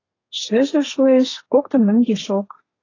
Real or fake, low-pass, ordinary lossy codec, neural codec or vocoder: fake; 7.2 kHz; AAC, 32 kbps; codec, 32 kHz, 1.9 kbps, SNAC